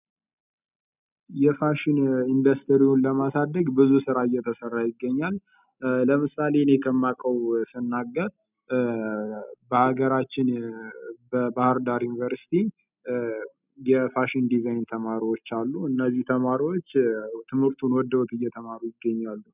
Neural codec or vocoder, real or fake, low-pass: none; real; 3.6 kHz